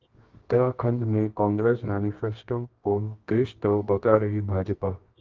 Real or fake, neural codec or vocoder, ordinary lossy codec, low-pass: fake; codec, 24 kHz, 0.9 kbps, WavTokenizer, medium music audio release; Opus, 32 kbps; 7.2 kHz